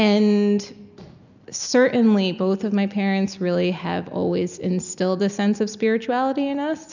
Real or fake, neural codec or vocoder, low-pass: real; none; 7.2 kHz